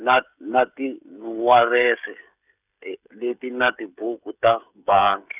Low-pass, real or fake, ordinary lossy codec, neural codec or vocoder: 3.6 kHz; fake; none; codec, 16 kHz, 16 kbps, FreqCodec, smaller model